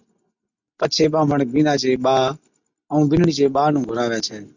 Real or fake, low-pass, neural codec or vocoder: real; 7.2 kHz; none